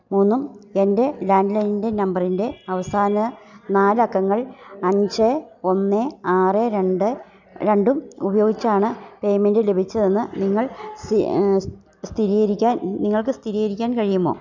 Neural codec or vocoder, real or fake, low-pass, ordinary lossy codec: none; real; 7.2 kHz; none